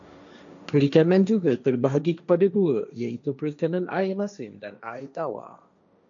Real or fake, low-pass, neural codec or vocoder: fake; 7.2 kHz; codec, 16 kHz, 1.1 kbps, Voila-Tokenizer